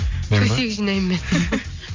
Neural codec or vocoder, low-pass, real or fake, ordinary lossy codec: none; 7.2 kHz; real; MP3, 48 kbps